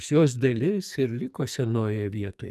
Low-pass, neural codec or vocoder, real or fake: 14.4 kHz; codec, 44.1 kHz, 2.6 kbps, SNAC; fake